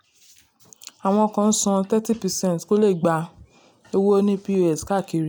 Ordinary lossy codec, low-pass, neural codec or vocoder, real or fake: none; none; none; real